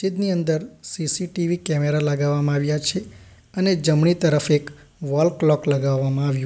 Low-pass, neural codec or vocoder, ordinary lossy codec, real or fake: none; none; none; real